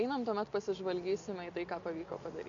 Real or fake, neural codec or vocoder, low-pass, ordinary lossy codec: real; none; 7.2 kHz; AAC, 64 kbps